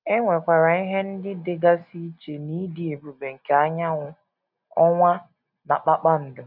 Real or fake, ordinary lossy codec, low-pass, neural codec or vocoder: real; none; 5.4 kHz; none